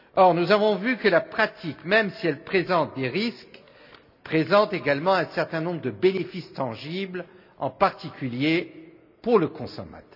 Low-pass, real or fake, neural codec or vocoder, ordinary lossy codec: 5.4 kHz; real; none; none